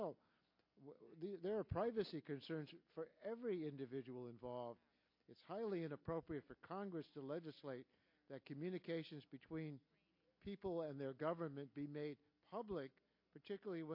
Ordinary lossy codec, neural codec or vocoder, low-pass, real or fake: MP3, 32 kbps; none; 5.4 kHz; real